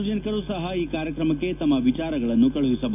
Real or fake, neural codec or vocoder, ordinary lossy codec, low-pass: real; none; none; 3.6 kHz